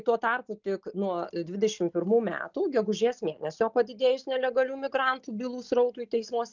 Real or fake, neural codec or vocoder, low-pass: real; none; 7.2 kHz